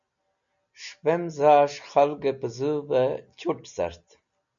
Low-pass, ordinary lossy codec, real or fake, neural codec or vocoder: 7.2 kHz; MP3, 96 kbps; real; none